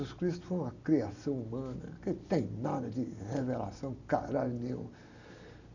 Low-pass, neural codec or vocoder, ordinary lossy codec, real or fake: 7.2 kHz; none; none; real